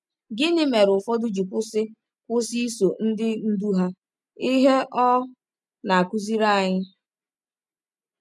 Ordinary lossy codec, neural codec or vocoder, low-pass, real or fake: none; none; none; real